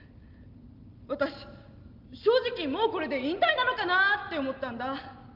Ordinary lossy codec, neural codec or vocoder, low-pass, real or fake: Opus, 24 kbps; none; 5.4 kHz; real